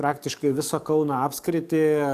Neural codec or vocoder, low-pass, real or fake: codec, 44.1 kHz, 7.8 kbps, DAC; 14.4 kHz; fake